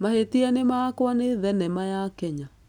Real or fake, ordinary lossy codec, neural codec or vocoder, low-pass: real; none; none; 19.8 kHz